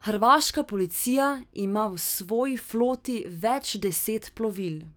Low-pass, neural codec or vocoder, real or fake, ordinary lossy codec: none; codec, 44.1 kHz, 7.8 kbps, DAC; fake; none